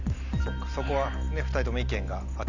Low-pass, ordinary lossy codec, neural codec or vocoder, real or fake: 7.2 kHz; none; none; real